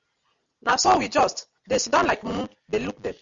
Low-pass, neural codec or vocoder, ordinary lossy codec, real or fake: 7.2 kHz; none; Opus, 64 kbps; real